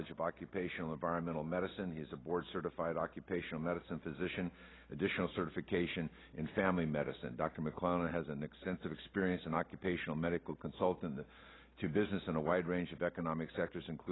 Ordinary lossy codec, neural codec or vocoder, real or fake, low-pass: AAC, 16 kbps; none; real; 7.2 kHz